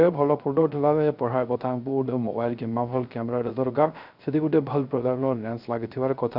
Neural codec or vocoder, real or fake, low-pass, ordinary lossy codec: codec, 16 kHz, 0.3 kbps, FocalCodec; fake; 5.4 kHz; none